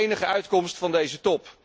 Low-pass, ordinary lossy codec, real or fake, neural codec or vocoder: none; none; real; none